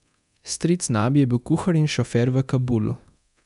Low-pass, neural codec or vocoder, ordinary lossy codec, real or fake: 10.8 kHz; codec, 24 kHz, 0.9 kbps, DualCodec; none; fake